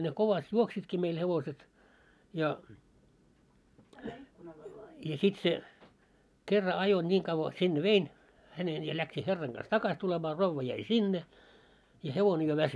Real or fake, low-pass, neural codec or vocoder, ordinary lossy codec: real; none; none; none